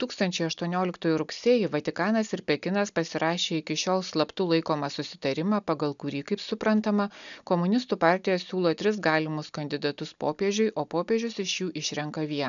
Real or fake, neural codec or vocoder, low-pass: real; none; 7.2 kHz